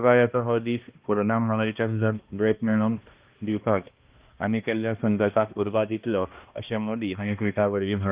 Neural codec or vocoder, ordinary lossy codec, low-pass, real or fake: codec, 16 kHz, 1 kbps, X-Codec, HuBERT features, trained on balanced general audio; Opus, 24 kbps; 3.6 kHz; fake